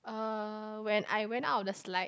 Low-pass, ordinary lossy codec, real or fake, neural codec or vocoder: none; none; real; none